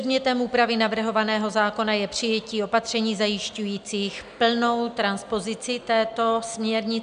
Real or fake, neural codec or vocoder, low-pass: real; none; 9.9 kHz